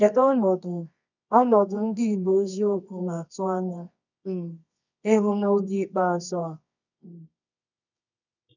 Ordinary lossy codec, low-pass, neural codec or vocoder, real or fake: none; 7.2 kHz; codec, 24 kHz, 0.9 kbps, WavTokenizer, medium music audio release; fake